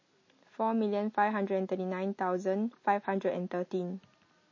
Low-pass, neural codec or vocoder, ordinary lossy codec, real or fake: 7.2 kHz; none; MP3, 32 kbps; real